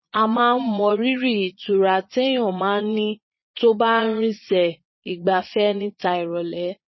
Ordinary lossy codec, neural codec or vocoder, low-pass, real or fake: MP3, 24 kbps; vocoder, 22.05 kHz, 80 mel bands, Vocos; 7.2 kHz; fake